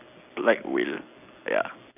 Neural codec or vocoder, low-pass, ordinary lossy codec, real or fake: none; 3.6 kHz; none; real